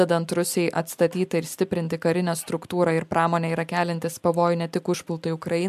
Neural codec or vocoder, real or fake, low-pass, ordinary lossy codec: none; real; 14.4 kHz; AAC, 96 kbps